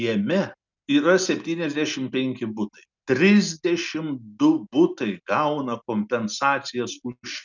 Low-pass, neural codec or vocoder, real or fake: 7.2 kHz; none; real